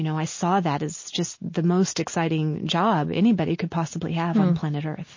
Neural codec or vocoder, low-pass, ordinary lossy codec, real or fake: none; 7.2 kHz; MP3, 32 kbps; real